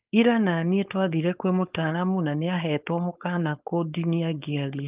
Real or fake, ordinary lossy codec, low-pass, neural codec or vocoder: fake; Opus, 32 kbps; 3.6 kHz; codec, 16 kHz, 4.8 kbps, FACodec